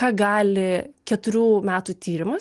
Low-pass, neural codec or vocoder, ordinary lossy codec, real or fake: 10.8 kHz; none; Opus, 24 kbps; real